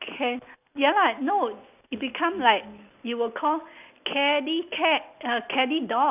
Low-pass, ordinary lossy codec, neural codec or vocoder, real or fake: 3.6 kHz; none; none; real